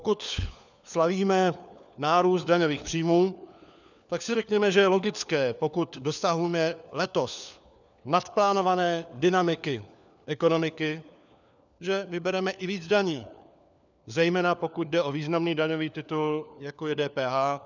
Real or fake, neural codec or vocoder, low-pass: fake; codec, 16 kHz, 4 kbps, FunCodec, trained on LibriTTS, 50 frames a second; 7.2 kHz